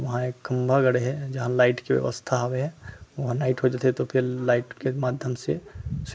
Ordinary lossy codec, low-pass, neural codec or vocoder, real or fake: none; none; none; real